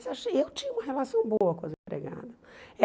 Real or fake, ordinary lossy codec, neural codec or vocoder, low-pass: real; none; none; none